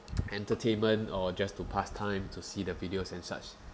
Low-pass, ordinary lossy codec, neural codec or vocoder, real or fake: none; none; none; real